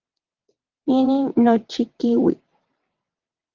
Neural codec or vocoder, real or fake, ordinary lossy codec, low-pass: vocoder, 22.05 kHz, 80 mel bands, WaveNeXt; fake; Opus, 24 kbps; 7.2 kHz